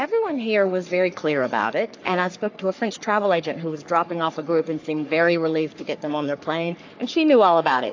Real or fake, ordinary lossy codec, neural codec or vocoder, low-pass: fake; AAC, 48 kbps; codec, 44.1 kHz, 3.4 kbps, Pupu-Codec; 7.2 kHz